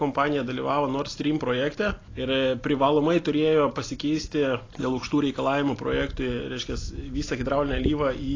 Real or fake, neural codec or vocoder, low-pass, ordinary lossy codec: real; none; 7.2 kHz; AAC, 32 kbps